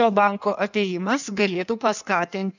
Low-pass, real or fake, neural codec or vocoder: 7.2 kHz; fake; codec, 16 kHz in and 24 kHz out, 1.1 kbps, FireRedTTS-2 codec